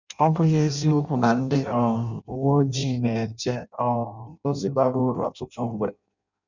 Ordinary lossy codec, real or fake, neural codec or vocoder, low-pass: none; fake; codec, 16 kHz in and 24 kHz out, 0.6 kbps, FireRedTTS-2 codec; 7.2 kHz